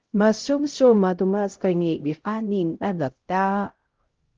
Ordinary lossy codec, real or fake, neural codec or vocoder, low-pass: Opus, 16 kbps; fake; codec, 16 kHz, 0.5 kbps, X-Codec, HuBERT features, trained on LibriSpeech; 7.2 kHz